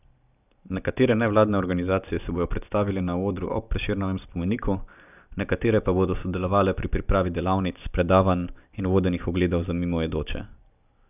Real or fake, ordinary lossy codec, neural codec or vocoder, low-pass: real; none; none; 3.6 kHz